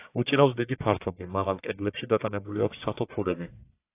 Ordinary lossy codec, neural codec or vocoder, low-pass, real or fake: AAC, 24 kbps; codec, 44.1 kHz, 1.7 kbps, Pupu-Codec; 3.6 kHz; fake